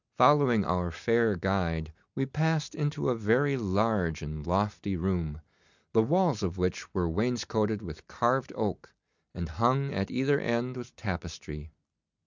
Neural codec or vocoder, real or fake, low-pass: none; real; 7.2 kHz